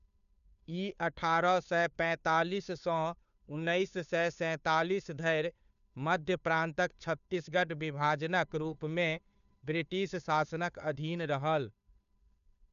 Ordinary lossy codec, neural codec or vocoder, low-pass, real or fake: none; codec, 16 kHz, 2 kbps, FunCodec, trained on Chinese and English, 25 frames a second; 7.2 kHz; fake